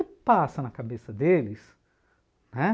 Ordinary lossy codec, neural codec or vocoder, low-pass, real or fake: none; none; none; real